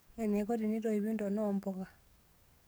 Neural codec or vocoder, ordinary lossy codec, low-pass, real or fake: codec, 44.1 kHz, 7.8 kbps, DAC; none; none; fake